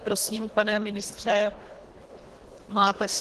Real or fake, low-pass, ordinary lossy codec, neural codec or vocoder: fake; 10.8 kHz; Opus, 16 kbps; codec, 24 kHz, 1.5 kbps, HILCodec